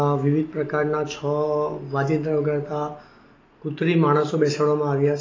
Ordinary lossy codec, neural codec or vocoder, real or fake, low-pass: AAC, 32 kbps; none; real; 7.2 kHz